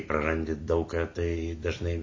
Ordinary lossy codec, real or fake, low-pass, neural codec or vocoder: MP3, 32 kbps; real; 7.2 kHz; none